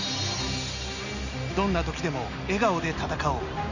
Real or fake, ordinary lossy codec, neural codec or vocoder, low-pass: real; none; none; 7.2 kHz